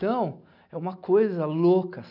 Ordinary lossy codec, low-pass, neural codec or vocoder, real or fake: none; 5.4 kHz; none; real